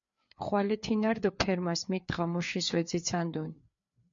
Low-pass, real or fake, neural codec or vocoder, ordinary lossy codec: 7.2 kHz; fake; codec, 16 kHz, 4 kbps, FreqCodec, larger model; MP3, 48 kbps